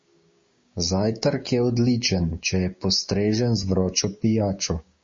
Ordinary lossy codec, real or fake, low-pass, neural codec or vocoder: MP3, 32 kbps; fake; 7.2 kHz; codec, 16 kHz, 6 kbps, DAC